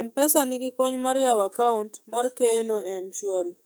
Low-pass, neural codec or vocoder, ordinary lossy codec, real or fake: none; codec, 44.1 kHz, 2.6 kbps, SNAC; none; fake